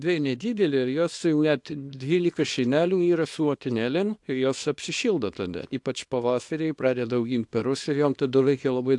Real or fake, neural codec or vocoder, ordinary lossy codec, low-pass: fake; codec, 24 kHz, 0.9 kbps, WavTokenizer, small release; AAC, 64 kbps; 10.8 kHz